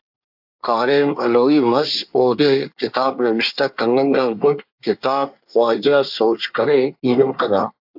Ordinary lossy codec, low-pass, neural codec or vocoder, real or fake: AAC, 48 kbps; 5.4 kHz; codec, 24 kHz, 1 kbps, SNAC; fake